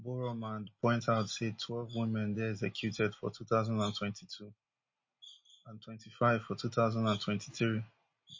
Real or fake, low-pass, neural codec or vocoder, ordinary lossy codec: real; 7.2 kHz; none; MP3, 32 kbps